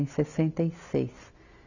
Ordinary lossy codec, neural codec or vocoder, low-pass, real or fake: none; none; 7.2 kHz; real